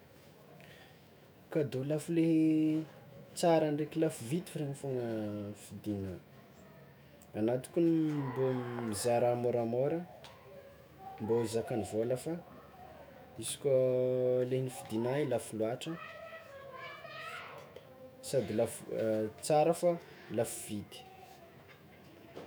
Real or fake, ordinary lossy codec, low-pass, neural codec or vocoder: fake; none; none; autoencoder, 48 kHz, 128 numbers a frame, DAC-VAE, trained on Japanese speech